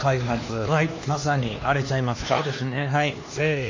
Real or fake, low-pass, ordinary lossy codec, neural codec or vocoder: fake; 7.2 kHz; MP3, 32 kbps; codec, 16 kHz, 2 kbps, X-Codec, HuBERT features, trained on LibriSpeech